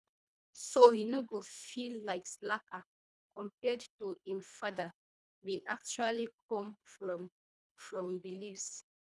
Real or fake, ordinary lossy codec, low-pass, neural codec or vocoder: fake; none; none; codec, 24 kHz, 1.5 kbps, HILCodec